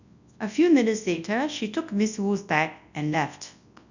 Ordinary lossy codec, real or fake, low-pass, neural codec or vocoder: none; fake; 7.2 kHz; codec, 24 kHz, 0.9 kbps, WavTokenizer, large speech release